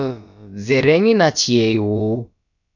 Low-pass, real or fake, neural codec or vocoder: 7.2 kHz; fake; codec, 16 kHz, about 1 kbps, DyCAST, with the encoder's durations